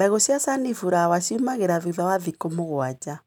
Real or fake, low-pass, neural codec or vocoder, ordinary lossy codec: real; 19.8 kHz; none; none